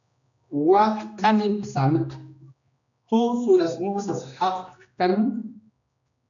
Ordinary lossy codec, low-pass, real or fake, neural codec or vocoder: MP3, 96 kbps; 7.2 kHz; fake; codec, 16 kHz, 1 kbps, X-Codec, HuBERT features, trained on general audio